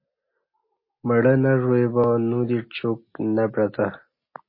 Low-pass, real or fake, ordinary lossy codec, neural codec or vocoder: 5.4 kHz; real; MP3, 24 kbps; none